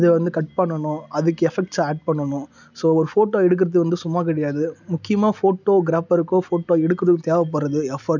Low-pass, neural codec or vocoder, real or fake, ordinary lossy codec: 7.2 kHz; none; real; none